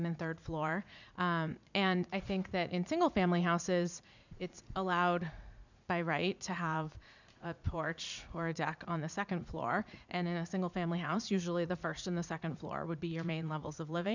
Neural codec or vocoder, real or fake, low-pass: vocoder, 44.1 kHz, 80 mel bands, Vocos; fake; 7.2 kHz